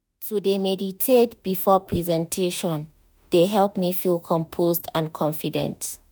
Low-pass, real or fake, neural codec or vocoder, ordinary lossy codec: none; fake; autoencoder, 48 kHz, 32 numbers a frame, DAC-VAE, trained on Japanese speech; none